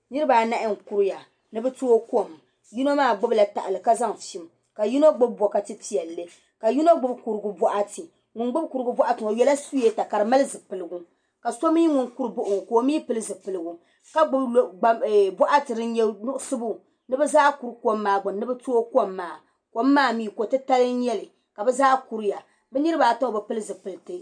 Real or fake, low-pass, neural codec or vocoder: real; 9.9 kHz; none